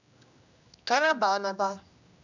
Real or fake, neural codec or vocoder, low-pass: fake; codec, 16 kHz, 1 kbps, X-Codec, HuBERT features, trained on general audio; 7.2 kHz